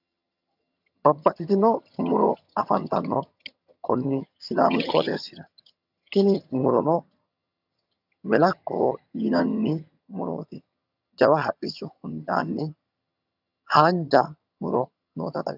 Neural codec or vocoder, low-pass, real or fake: vocoder, 22.05 kHz, 80 mel bands, HiFi-GAN; 5.4 kHz; fake